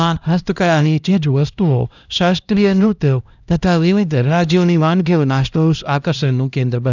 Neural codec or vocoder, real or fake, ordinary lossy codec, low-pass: codec, 16 kHz, 1 kbps, X-Codec, HuBERT features, trained on LibriSpeech; fake; none; 7.2 kHz